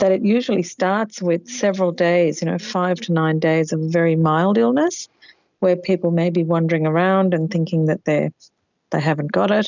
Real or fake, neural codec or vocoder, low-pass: real; none; 7.2 kHz